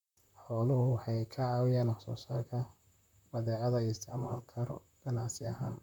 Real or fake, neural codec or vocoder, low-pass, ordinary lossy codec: fake; vocoder, 44.1 kHz, 128 mel bands, Pupu-Vocoder; 19.8 kHz; none